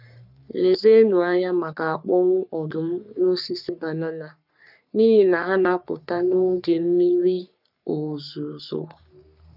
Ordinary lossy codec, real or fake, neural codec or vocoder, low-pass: none; fake; codec, 44.1 kHz, 3.4 kbps, Pupu-Codec; 5.4 kHz